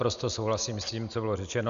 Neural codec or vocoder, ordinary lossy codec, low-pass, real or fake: none; Opus, 64 kbps; 7.2 kHz; real